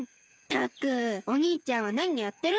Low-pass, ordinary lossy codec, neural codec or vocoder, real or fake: none; none; codec, 16 kHz, 8 kbps, FreqCodec, smaller model; fake